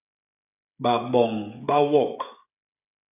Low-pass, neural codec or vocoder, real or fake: 3.6 kHz; codec, 16 kHz, 8 kbps, FreqCodec, smaller model; fake